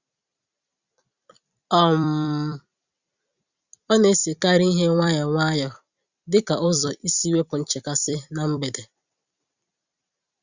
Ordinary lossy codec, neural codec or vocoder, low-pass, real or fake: Opus, 64 kbps; vocoder, 44.1 kHz, 128 mel bands every 256 samples, BigVGAN v2; 7.2 kHz; fake